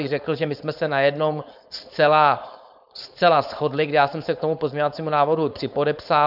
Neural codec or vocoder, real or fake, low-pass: codec, 16 kHz, 4.8 kbps, FACodec; fake; 5.4 kHz